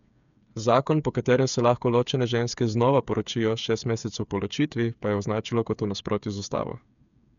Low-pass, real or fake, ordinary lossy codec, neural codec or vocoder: 7.2 kHz; fake; none; codec, 16 kHz, 8 kbps, FreqCodec, smaller model